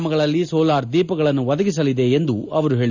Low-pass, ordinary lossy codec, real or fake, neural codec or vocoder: 7.2 kHz; none; real; none